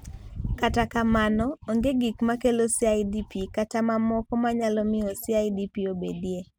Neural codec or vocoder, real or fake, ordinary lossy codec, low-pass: none; real; none; none